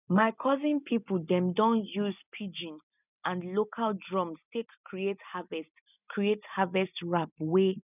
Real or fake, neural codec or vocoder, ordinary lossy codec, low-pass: real; none; none; 3.6 kHz